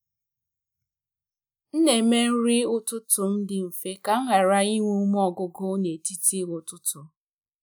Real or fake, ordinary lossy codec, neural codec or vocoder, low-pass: real; none; none; none